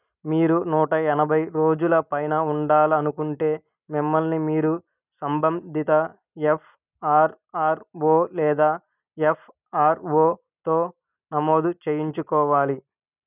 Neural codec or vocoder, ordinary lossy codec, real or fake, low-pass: none; none; real; 3.6 kHz